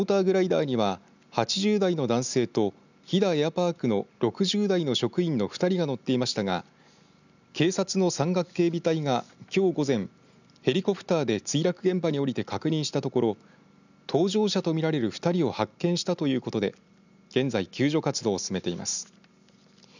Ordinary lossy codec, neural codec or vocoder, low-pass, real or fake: none; none; 7.2 kHz; real